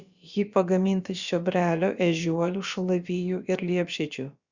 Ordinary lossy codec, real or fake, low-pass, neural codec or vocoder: Opus, 64 kbps; fake; 7.2 kHz; codec, 16 kHz, about 1 kbps, DyCAST, with the encoder's durations